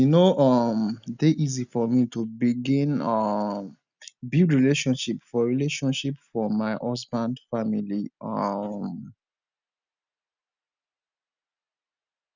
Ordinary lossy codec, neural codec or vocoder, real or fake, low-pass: none; none; real; 7.2 kHz